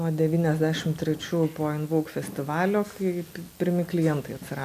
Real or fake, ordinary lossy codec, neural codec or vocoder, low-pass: real; MP3, 96 kbps; none; 14.4 kHz